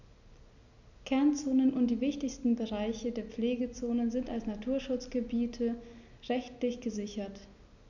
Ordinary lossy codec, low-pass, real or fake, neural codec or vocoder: none; 7.2 kHz; real; none